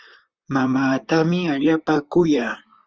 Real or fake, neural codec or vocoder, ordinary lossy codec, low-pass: fake; vocoder, 44.1 kHz, 128 mel bands, Pupu-Vocoder; Opus, 24 kbps; 7.2 kHz